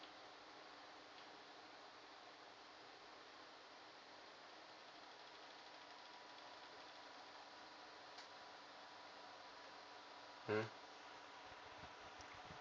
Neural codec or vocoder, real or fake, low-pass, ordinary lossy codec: none; real; none; none